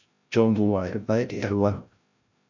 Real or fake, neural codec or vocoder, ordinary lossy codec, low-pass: fake; codec, 16 kHz, 0.5 kbps, FreqCodec, larger model; AAC, 48 kbps; 7.2 kHz